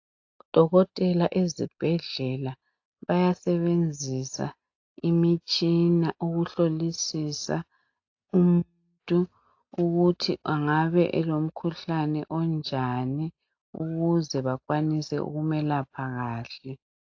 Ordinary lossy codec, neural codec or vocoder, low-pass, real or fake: AAC, 48 kbps; none; 7.2 kHz; real